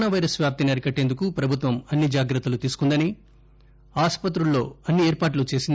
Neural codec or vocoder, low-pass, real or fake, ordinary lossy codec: none; none; real; none